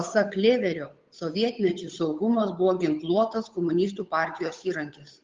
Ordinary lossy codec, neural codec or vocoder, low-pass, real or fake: Opus, 32 kbps; codec, 16 kHz, 8 kbps, FunCodec, trained on Chinese and English, 25 frames a second; 7.2 kHz; fake